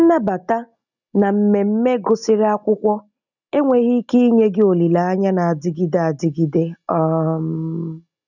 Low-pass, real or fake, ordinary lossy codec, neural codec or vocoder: 7.2 kHz; real; none; none